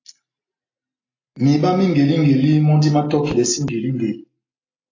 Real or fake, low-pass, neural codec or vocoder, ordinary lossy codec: real; 7.2 kHz; none; AAC, 32 kbps